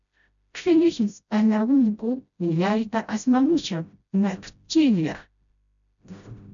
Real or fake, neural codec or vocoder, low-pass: fake; codec, 16 kHz, 0.5 kbps, FreqCodec, smaller model; 7.2 kHz